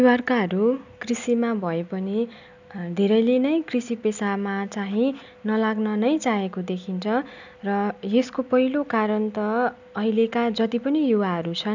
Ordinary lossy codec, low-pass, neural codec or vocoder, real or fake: none; 7.2 kHz; none; real